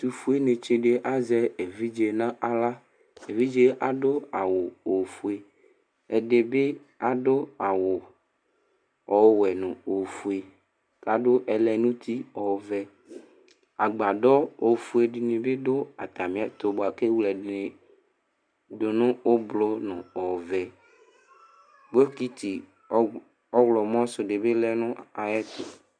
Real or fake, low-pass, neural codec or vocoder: real; 9.9 kHz; none